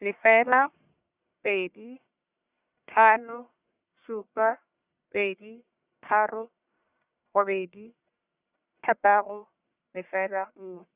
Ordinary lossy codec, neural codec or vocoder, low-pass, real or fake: Opus, 64 kbps; codec, 44.1 kHz, 1.7 kbps, Pupu-Codec; 3.6 kHz; fake